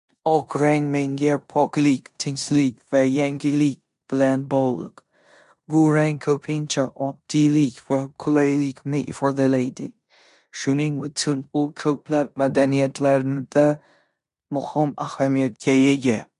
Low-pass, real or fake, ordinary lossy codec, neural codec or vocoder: 10.8 kHz; fake; MP3, 64 kbps; codec, 16 kHz in and 24 kHz out, 0.9 kbps, LongCat-Audio-Codec, four codebook decoder